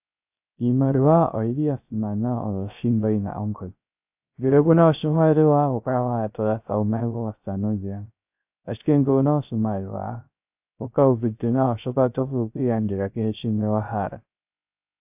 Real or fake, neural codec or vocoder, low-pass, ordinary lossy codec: fake; codec, 16 kHz, 0.3 kbps, FocalCodec; 3.6 kHz; AAC, 32 kbps